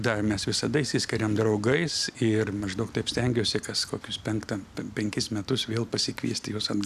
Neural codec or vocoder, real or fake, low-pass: none; real; 14.4 kHz